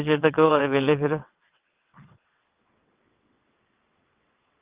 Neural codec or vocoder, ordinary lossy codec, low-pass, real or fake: vocoder, 22.05 kHz, 80 mel bands, WaveNeXt; Opus, 32 kbps; 3.6 kHz; fake